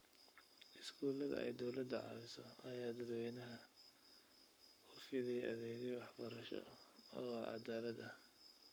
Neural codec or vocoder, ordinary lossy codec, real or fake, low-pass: codec, 44.1 kHz, 7.8 kbps, Pupu-Codec; none; fake; none